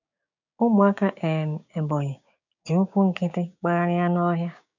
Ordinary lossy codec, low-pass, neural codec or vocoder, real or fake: none; 7.2 kHz; codec, 16 kHz, 6 kbps, DAC; fake